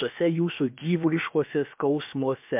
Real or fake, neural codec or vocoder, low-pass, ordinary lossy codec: fake; codec, 16 kHz, about 1 kbps, DyCAST, with the encoder's durations; 3.6 kHz; MP3, 32 kbps